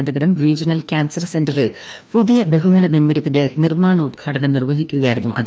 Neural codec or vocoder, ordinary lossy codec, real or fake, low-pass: codec, 16 kHz, 1 kbps, FreqCodec, larger model; none; fake; none